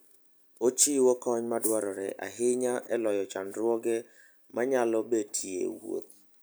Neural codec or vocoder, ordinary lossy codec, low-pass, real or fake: none; none; none; real